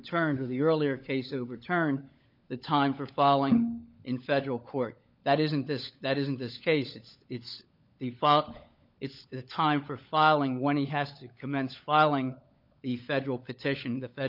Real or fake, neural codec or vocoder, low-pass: fake; codec, 16 kHz, 16 kbps, FunCodec, trained on LibriTTS, 50 frames a second; 5.4 kHz